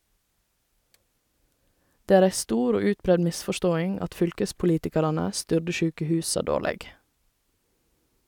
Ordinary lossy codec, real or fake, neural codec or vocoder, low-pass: none; real; none; 19.8 kHz